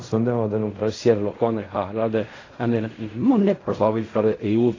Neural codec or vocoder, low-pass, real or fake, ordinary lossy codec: codec, 16 kHz in and 24 kHz out, 0.4 kbps, LongCat-Audio-Codec, fine tuned four codebook decoder; 7.2 kHz; fake; AAC, 32 kbps